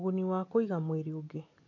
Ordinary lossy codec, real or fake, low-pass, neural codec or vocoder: none; real; 7.2 kHz; none